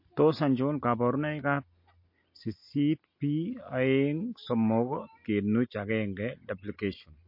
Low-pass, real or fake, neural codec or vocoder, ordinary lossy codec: 5.4 kHz; real; none; MP3, 32 kbps